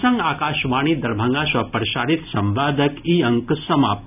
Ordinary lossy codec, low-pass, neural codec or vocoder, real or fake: none; 3.6 kHz; none; real